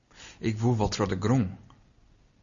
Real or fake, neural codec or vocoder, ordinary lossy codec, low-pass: real; none; Opus, 64 kbps; 7.2 kHz